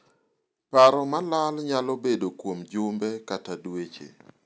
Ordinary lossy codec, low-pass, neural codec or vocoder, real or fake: none; none; none; real